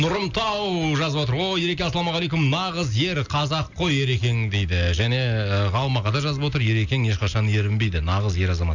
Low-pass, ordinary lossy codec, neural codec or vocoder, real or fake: 7.2 kHz; none; none; real